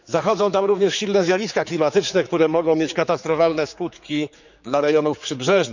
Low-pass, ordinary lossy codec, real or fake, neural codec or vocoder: 7.2 kHz; none; fake; codec, 16 kHz, 4 kbps, X-Codec, HuBERT features, trained on general audio